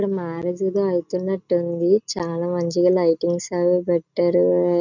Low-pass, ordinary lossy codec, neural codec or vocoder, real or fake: 7.2 kHz; none; none; real